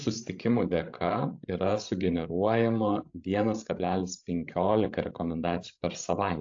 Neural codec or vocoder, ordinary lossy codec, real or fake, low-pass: codec, 16 kHz, 16 kbps, FreqCodec, larger model; AAC, 48 kbps; fake; 7.2 kHz